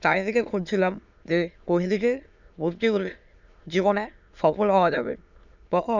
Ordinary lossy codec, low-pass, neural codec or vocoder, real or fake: none; 7.2 kHz; autoencoder, 22.05 kHz, a latent of 192 numbers a frame, VITS, trained on many speakers; fake